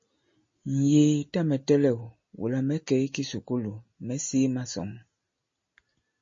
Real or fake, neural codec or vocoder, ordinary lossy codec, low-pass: real; none; MP3, 32 kbps; 7.2 kHz